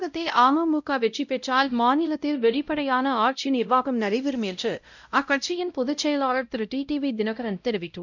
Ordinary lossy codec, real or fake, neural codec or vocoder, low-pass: none; fake; codec, 16 kHz, 0.5 kbps, X-Codec, WavLM features, trained on Multilingual LibriSpeech; 7.2 kHz